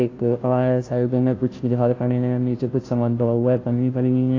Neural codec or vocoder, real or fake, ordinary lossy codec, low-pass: codec, 16 kHz, 0.5 kbps, FunCodec, trained on Chinese and English, 25 frames a second; fake; AAC, 32 kbps; 7.2 kHz